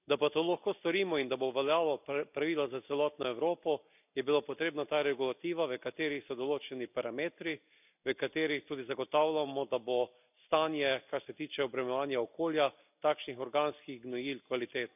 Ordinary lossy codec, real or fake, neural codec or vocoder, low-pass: none; real; none; 3.6 kHz